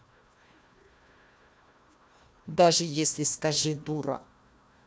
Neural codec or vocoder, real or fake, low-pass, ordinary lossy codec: codec, 16 kHz, 1 kbps, FunCodec, trained on Chinese and English, 50 frames a second; fake; none; none